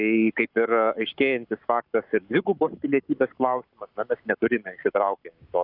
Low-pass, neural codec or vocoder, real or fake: 5.4 kHz; codec, 44.1 kHz, 7.8 kbps, Pupu-Codec; fake